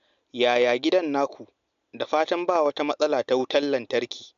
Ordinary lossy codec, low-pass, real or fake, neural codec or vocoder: MP3, 64 kbps; 7.2 kHz; real; none